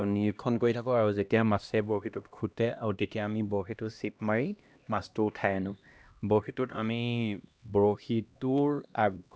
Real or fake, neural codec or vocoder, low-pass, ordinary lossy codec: fake; codec, 16 kHz, 1 kbps, X-Codec, HuBERT features, trained on LibriSpeech; none; none